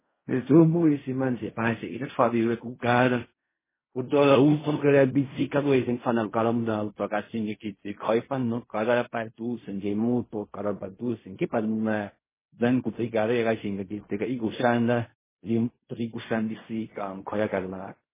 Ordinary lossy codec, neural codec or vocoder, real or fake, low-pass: MP3, 16 kbps; codec, 16 kHz in and 24 kHz out, 0.4 kbps, LongCat-Audio-Codec, fine tuned four codebook decoder; fake; 3.6 kHz